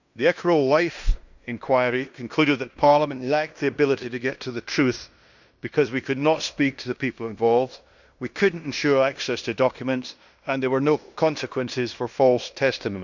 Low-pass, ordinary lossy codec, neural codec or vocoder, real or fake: 7.2 kHz; none; codec, 16 kHz in and 24 kHz out, 0.9 kbps, LongCat-Audio-Codec, fine tuned four codebook decoder; fake